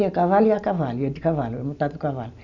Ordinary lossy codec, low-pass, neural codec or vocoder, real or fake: none; 7.2 kHz; none; real